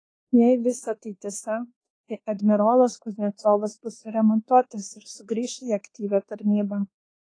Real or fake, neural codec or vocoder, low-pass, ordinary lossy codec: fake; codec, 24 kHz, 1.2 kbps, DualCodec; 9.9 kHz; AAC, 32 kbps